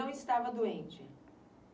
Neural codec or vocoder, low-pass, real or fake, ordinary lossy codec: none; none; real; none